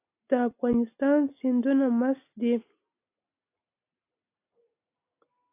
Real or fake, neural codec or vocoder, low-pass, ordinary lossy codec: real; none; 3.6 kHz; AAC, 24 kbps